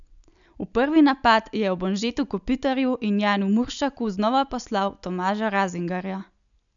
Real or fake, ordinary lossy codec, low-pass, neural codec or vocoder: real; none; 7.2 kHz; none